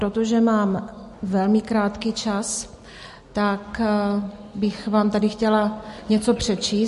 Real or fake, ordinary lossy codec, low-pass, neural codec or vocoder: real; MP3, 48 kbps; 14.4 kHz; none